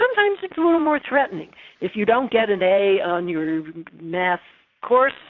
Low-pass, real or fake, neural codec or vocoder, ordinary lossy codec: 7.2 kHz; fake; vocoder, 22.05 kHz, 80 mel bands, Vocos; AAC, 48 kbps